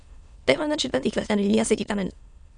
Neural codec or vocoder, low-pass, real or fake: autoencoder, 22.05 kHz, a latent of 192 numbers a frame, VITS, trained on many speakers; 9.9 kHz; fake